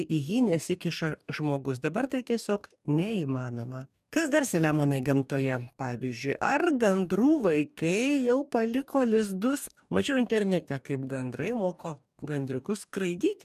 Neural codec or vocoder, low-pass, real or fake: codec, 44.1 kHz, 2.6 kbps, DAC; 14.4 kHz; fake